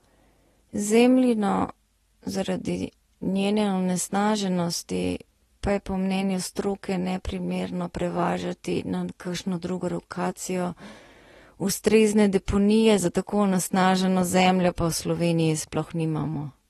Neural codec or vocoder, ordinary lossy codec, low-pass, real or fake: none; AAC, 32 kbps; 19.8 kHz; real